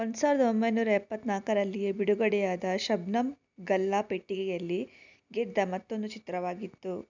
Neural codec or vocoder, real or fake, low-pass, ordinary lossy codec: none; real; 7.2 kHz; none